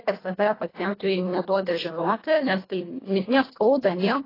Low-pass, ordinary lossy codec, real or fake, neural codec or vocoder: 5.4 kHz; AAC, 24 kbps; fake; codec, 24 kHz, 1.5 kbps, HILCodec